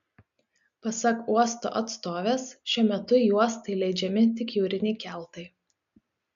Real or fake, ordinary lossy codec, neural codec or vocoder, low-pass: real; MP3, 96 kbps; none; 7.2 kHz